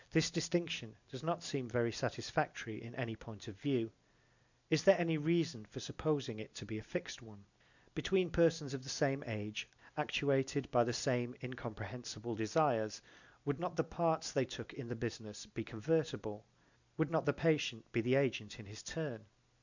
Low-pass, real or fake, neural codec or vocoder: 7.2 kHz; real; none